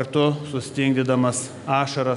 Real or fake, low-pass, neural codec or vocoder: real; 10.8 kHz; none